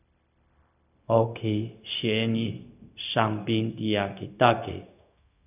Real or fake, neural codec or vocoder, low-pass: fake; codec, 16 kHz, 0.4 kbps, LongCat-Audio-Codec; 3.6 kHz